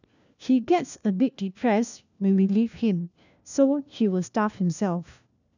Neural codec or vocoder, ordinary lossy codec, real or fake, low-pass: codec, 16 kHz, 1 kbps, FunCodec, trained on LibriTTS, 50 frames a second; none; fake; 7.2 kHz